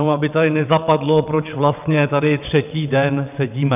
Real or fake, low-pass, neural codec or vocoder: fake; 3.6 kHz; vocoder, 22.05 kHz, 80 mel bands, WaveNeXt